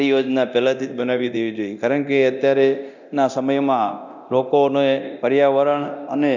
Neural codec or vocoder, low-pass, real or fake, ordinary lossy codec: codec, 24 kHz, 0.9 kbps, DualCodec; 7.2 kHz; fake; none